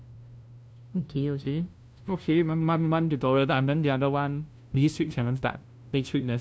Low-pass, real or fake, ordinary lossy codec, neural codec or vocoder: none; fake; none; codec, 16 kHz, 0.5 kbps, FunCodec, trained on LibriTTS, 25 frames a second